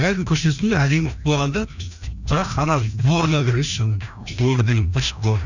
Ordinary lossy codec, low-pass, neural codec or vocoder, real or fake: none; 7.2 kHz; codec, 16 kHz, 1 kbps, FreqCodec, larger model; fake